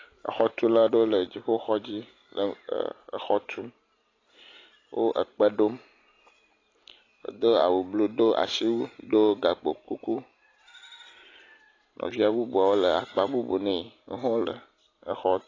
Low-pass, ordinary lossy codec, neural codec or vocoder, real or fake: 7.2 kHz; AAC, 32 kbps; none; real